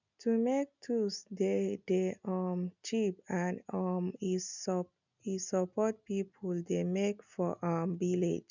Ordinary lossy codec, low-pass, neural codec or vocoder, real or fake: none; 7.2 kHz; none; real